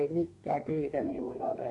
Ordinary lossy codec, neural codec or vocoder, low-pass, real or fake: none; codec, 24 kHz, 1 kbps, SNAC; 10.8 kHz; fake